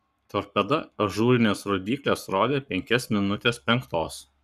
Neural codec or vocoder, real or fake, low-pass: codec, 44.1 kHz, 7.8 kbps, Pupu-Codec; fake; 14.4 kHz